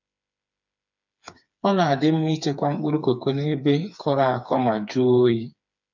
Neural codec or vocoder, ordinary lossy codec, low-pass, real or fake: codec, 16 kHz, 4 kbps, FreqCodec, smaller model; none; 7.2 kHz; fake